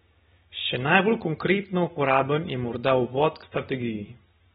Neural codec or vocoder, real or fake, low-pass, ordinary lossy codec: none; real; 10.8 kHz; AAC, 16 kbps